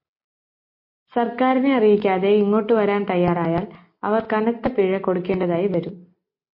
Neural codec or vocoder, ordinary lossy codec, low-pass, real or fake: none; MP3, 32 kbps; 5.4 kHz; real